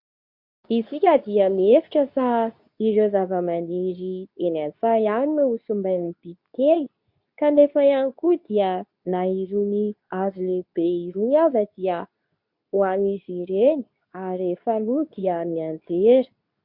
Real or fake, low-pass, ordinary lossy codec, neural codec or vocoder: fake; 5.4 kHz; MP3, 48 kbps; codec, 24 kHz, 0.9 kbps, WavTokenizer, medium speech release version 2